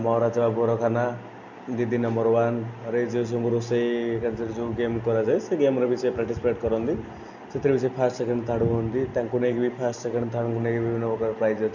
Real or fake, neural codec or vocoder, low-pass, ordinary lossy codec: real; none; 7.2 kHz; none